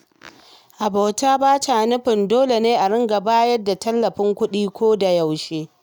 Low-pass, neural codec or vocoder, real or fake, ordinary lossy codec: none; none; real; none